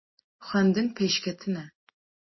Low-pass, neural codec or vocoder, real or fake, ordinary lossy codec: 7.2 kHz; none; real; MP3, 24 kbps